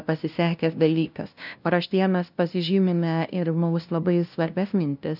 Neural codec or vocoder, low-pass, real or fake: codec, 16 kHz, 0.5 kbps, FunCodec, trained on LibriTTS, 25 frames a second; 5.4 kHz; fake